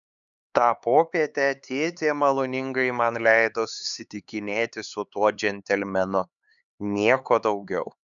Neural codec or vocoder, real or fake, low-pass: codec, 16 kHz, 4 kbps, X-Codec, HuBERT features, trained on LibriSpeech; fake; 7.2 kHz